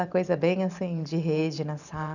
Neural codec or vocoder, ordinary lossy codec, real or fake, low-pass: vocoder, 44.1 kHz, 128 mel bands every 512 samples, BigVGAN v2; none; fake; 7.2 kHz